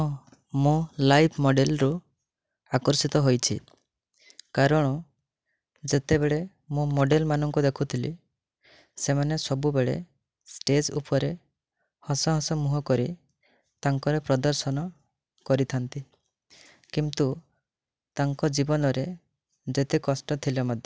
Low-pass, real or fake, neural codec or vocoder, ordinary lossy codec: none; real; none; none